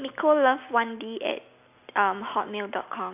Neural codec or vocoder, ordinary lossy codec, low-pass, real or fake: none; none; 3.6 kHz; real